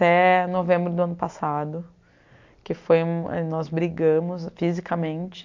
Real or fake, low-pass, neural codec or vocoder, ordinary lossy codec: real; 7.2 kHz; none; MP3, 48 kbps